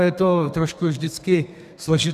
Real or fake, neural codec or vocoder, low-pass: fake; codec, 32 kHz, 1.9 kbps, SNAC; 14.4 kHz